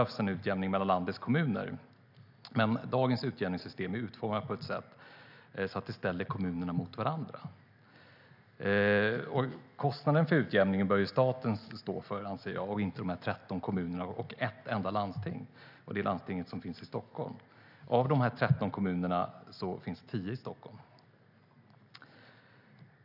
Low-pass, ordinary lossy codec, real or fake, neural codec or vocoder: 5.4 kHz; none; real; none